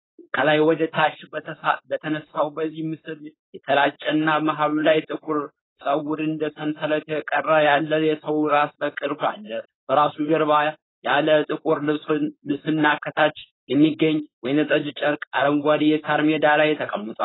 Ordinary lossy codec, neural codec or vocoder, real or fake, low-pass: AAC, 16 kbps; codec, 16 kHz, 4.8 kbps, FACodec; fake; 7.2 kHz